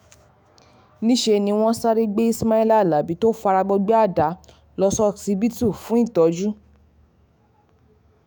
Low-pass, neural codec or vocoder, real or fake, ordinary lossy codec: none; autoencoder, 48 kHz, 128 numbers a frame, DAC-VAE, trained on Japanese speech; fake; none